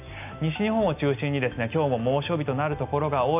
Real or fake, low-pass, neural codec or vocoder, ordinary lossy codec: real; 3.6 kHz; none; none